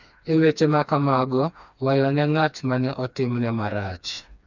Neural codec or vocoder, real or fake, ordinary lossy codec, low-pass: codec, 16 kHz, 2 kbps, FreqCodec, smaller model; fake; none; 7.2 kHz